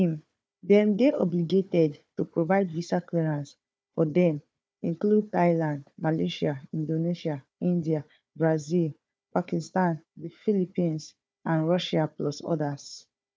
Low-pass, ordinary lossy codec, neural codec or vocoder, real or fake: none; none; codec, 16 kHz, 4 kbps, FunCodec, trained on Chinese and English, 50 frames a second; fake